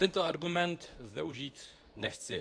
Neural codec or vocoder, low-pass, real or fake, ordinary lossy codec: codec, 24 kHz, 0.9 kbps, WavTokenizer, medium speech release version 2; 9.9 kHz; fake; AAC, 64 kbps